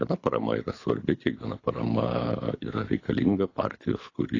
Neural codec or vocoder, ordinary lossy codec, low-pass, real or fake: codec, 24 kHz, 6 kbps, HILCodec; AAC, 32 kbps; 7.2 kHz; fake